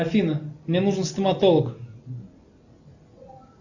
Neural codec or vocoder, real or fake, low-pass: none; real; 7.2 kHz